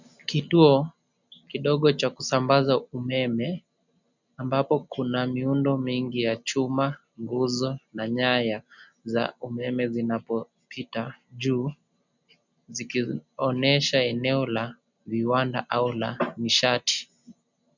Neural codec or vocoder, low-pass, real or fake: none; 7.2 kHz; real